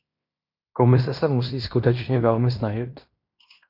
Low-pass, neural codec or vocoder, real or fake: 5.4 kHz; codec, 16 kHz in and 24 kHz out, 0.9 kbps, LongCat-Audio-Codec, fine tuned four codebook decoder; fake